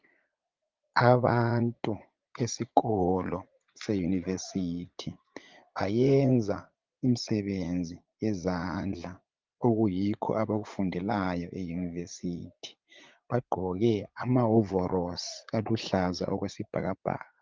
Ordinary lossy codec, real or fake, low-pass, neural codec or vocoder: Opus, 32 kbps; fake; 7.2 kHz; vocoder, 44.1 kHz, 80 mel bands, Vocos